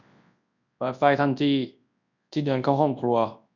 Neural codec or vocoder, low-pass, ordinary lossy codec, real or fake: codec, 24 kHz, 0.9 kbps, WavTokenizer, large speech release; 7.2 kHz; none; fake